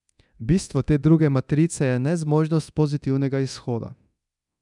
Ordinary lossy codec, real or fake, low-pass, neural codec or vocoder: none; fake; 10.8 kHz; codec, 24 kHz, 0.9 kbps, DualCodec